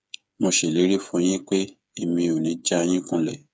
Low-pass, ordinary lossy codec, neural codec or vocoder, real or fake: none; none; codec, 16 kHz, 8 kbps, FreqCodec, smaller model; fake